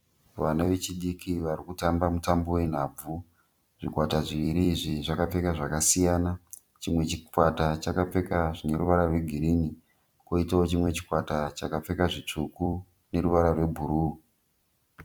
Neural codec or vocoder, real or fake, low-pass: vocoder, 44.1 kHz, 128 mel bands every 256 samples, BigVGAN v2; fake; 19.8 kHz